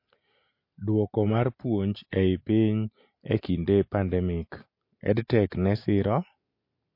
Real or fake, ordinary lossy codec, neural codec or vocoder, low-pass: real; MP3, 32 kbps; none; 5.4 kHz